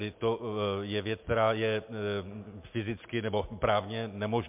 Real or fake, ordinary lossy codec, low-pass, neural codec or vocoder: real; MP3, 32 kbps; 3.6 kHz; none